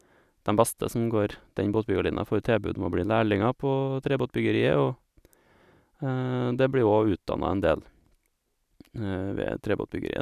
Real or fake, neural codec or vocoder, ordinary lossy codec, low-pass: real; none; none; 14.4 kHz